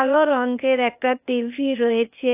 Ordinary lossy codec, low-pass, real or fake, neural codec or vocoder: AAC, 32 kbps; 3.6 kHz; fake; codec, 16 kHz, 0.8 kbps, ZipCodec